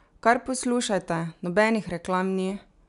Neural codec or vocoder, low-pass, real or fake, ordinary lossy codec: none; 10.8 kHz; real; none